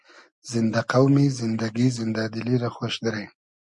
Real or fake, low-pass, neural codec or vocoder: real; 10.8 kHz; none